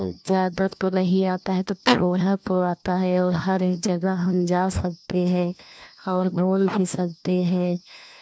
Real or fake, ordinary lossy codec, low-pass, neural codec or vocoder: fake; none; none; codec, 16 kHz, 1 kbps, FunCodec, trained on LibriTTS, 50 frames a second